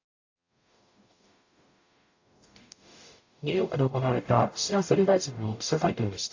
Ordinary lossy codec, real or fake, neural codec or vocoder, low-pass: AAC, 48 kbps; fake; codec, 44.1 kHz, 0.9 kbps, DAC; 7.2 kHz